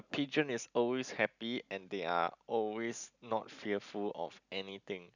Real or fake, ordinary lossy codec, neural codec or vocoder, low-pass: real; none; none; 7.2 kHz